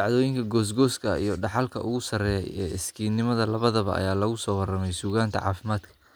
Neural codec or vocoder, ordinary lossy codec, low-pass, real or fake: none; none; none; real